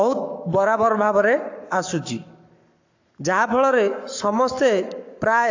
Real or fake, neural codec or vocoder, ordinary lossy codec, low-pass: fake; codec, 44.1 kHz, 7.8 kbps, Pupu-Codec; AAC, 48 kbps; 7.2 kHz